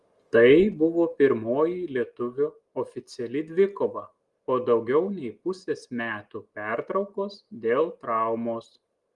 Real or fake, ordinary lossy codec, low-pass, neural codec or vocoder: real; Opus, 24 kbps; 10.8 kHz; none